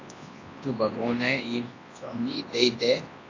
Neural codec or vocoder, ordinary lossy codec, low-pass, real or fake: codec, 24 kHz, 0.9 kbps, WavTokenizer, large speech release; AAC, 32 kbps; 7.2 kHz; fake